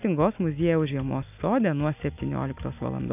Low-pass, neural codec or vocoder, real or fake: 3.6 kHz; none; real